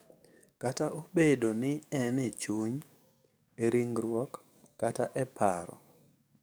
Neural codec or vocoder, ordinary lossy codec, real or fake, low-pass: codec, 44.1 kHz, 7.8 kbps, DAC; none; fake; none